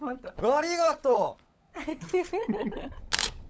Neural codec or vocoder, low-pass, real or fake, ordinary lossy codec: codec, 16 kHz, 16 kbps, FunCodec, trained on LibriTTS, 50 frames a second; none; fake; none